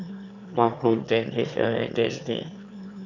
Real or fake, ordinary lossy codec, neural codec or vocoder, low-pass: fake; none; autoencoder, 22.05 kHz, a latent of 192 numbers a frame, VITS, trained on one speaker; 7.2 kHz